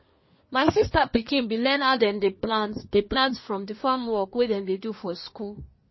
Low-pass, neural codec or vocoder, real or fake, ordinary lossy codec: 7.2 kHz; codec, 24 kHz, 1 kbps, SNAC; fake; MP3, 24 kbps